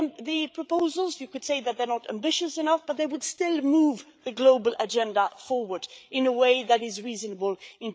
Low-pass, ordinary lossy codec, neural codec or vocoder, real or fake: none; none; codec, 16 kHz, 8 kbps, FreqCodec, larger model; fake